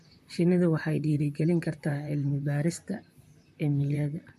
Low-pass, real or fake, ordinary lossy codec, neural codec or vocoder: 14.4 kHz; fake; MP3, 64 kbps; vocoder, 44.1 kHz, 128 mel bands, Pupu-Vocoder